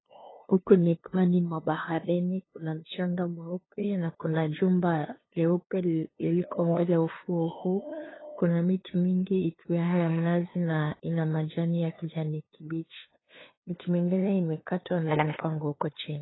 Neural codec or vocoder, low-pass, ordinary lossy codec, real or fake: codec, 16 kHz, 2 kbps, FunCodec, trained on LibriTTS, 25 frames a second; 7.2 kHz; AAC, 16 kbps; fake